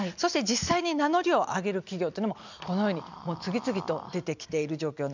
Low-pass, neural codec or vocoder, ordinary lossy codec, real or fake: 7.2 kHz; none; none; real